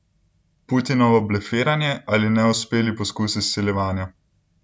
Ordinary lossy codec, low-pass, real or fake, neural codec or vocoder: none; none; real; none